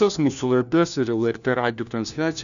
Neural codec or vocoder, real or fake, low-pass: codec, 16 kHz, 1 kbps, FunCodec, trained on LibriTTS, 50 frames a second; fake; 7.2 kHz